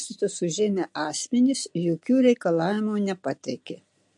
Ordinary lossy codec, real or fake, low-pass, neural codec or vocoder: MP3, 48 kbps; real; 10.8 kHz; none